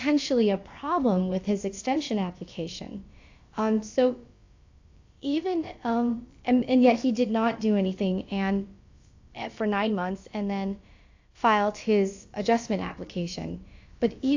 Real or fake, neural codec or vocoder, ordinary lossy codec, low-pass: fake; codec, 16 kHz, about 1 kbps, DyCAST, with the encoder's durations; AAC, 48 kbps; 7.2 kHz